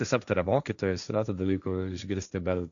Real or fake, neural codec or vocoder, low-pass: fake; codec, 16 kHz, 1.1 kbps, Voila-Tokenizer; 7.2 kHz